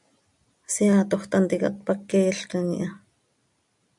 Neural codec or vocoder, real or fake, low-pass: none; real; 10.8 kHz